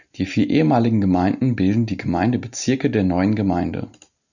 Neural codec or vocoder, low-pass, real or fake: none; 7.2 kHz; real